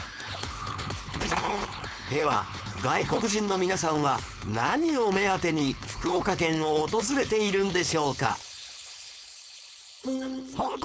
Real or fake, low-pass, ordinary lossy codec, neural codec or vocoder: fake; none; none; codec, 16 kHz, 4.8 kbps, FACodec